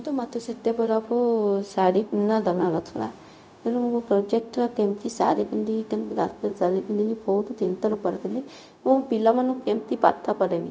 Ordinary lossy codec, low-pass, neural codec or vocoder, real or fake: none; none; codec, 16 kHz, 0.4 kbps, LongCat-Audio-Codec; fake